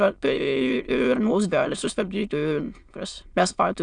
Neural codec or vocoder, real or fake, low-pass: autoencoder, 22.05 kHz, a latent of 192 numbers a frame, VITS, trained on many speakers; fake; 9.9 kHz